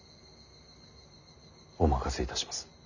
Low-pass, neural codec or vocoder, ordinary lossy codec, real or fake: 7.2 kHz; none; none; real